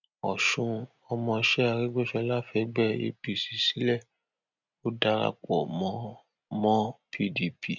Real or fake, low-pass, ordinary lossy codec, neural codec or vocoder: real; 7.2 kHz; none; none